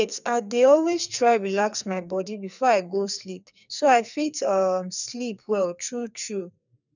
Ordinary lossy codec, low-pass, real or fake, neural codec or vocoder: none; 7.2 kHz; fake; codec, 44.1 kHz, 2.6 kbps, SNAC